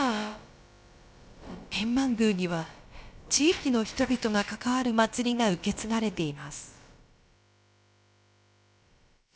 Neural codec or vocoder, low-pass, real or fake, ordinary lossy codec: codec, 16 kHz, about 1 kbps, DyCAST, with the encoder's durations; none; fake; none